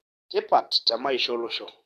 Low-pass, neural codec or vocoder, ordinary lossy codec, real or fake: 14.4 kHz; codec, 44.1 kHz, 7.8 kbps, DAC; none; fake